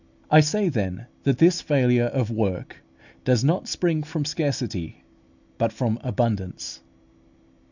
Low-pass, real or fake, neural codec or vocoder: 7.2 kHz; real; none